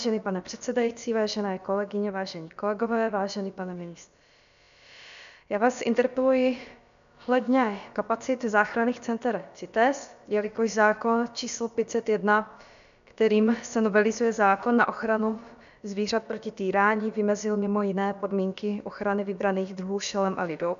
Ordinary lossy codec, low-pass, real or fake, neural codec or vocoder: MP3, 96 kbps; 7.2 kHz; fake; codec, 16 kHz, about 1 kbps, DyCAST, with the encoder's durations